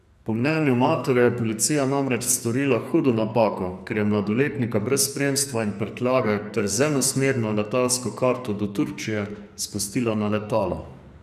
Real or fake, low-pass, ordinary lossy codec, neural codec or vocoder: fake; 14.4 kHz; none; codec, 44.1 kHz, 2.6 kbps, SNAC